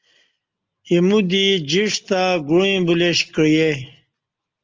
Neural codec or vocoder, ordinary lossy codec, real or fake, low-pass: none; Opus, 24 kbps; real; 7.2 kHz